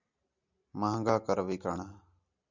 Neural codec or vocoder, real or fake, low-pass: none; real; 7.2 kHz